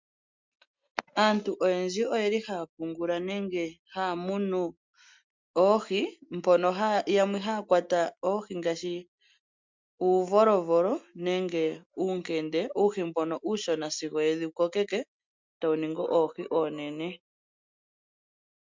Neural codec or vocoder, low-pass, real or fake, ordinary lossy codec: none; 7.2 kHz; real; MP3, 64 kbps